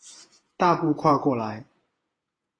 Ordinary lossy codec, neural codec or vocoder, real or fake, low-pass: AAC, 32 kbps; vocoder, 48 kHz, 128 mel bands, Vocos; fake; 9.9 kHz